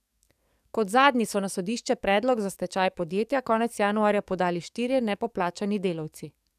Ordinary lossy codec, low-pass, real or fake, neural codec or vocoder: none; 14.4 kHz; fake; codec, 44.1 kHz, 7.8 kbps, DAC